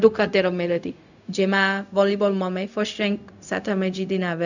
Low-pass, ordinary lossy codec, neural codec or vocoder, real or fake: 7.2 kHz; none; codec, 16 kHz, 0.4 kbps, LongCat-Audio-Codec; fake